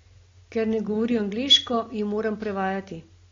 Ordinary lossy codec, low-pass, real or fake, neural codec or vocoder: AAC, 32 kbps; 7.2 kHz; real; none